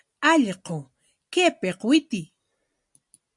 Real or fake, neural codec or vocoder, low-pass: real; none; 10.8 kHz